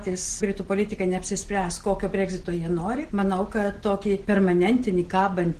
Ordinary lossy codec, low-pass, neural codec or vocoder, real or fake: Opus, 16 kbps; 14.4 kHz; none; real